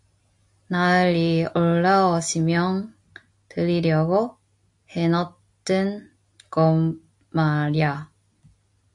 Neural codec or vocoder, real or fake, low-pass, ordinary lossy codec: none; real; 10.8 kHz; AAC, 64 kbps